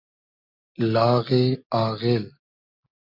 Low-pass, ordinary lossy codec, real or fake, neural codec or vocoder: 5.4 kHz; MP3, 48 kbps; real; none